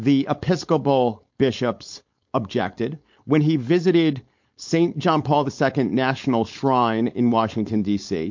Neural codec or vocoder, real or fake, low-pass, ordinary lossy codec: codec, 16 kHz, 4.8 kbps, FACodec; fake; 7.2 kHz; MP3, 48 kbps